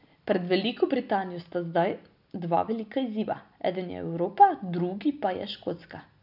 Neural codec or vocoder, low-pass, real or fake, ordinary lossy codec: none; 5.4 kHz; real; none